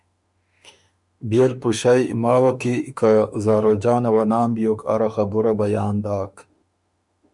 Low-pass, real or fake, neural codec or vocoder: 10.8 kHz; fake; autoencoder, 48 kHz, 32 numbers a frame, DAC-VAE, trained on Japanese speech